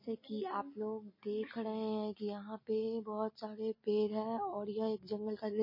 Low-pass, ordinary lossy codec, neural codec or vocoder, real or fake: 5.4 kHz; MP3, 24 kbps; none; real